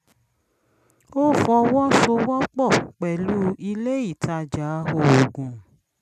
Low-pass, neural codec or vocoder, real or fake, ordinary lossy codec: 14.4 kHz; none; real; none